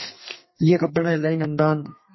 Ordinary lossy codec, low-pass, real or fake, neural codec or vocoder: MP3, 24 kbps; 7.2 kHz; fake; codec, 32 kHz, 1.9 kbps, SNAC